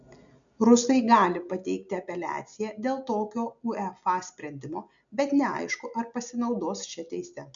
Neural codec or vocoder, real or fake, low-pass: none; real; 7.2 kHz